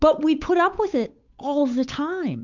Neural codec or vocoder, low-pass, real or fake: codec, 16 kHz, 8 kbps, FunCodec, trained on Chinese and English, 25 frames a second; 7.2 kHz; fake